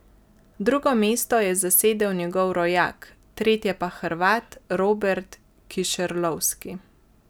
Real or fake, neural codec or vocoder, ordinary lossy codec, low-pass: real; none; none; none